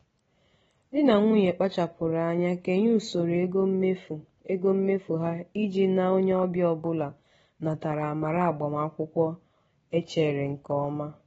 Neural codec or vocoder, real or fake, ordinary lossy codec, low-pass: none; real; AAC, 24 kbps; 19.8 kHz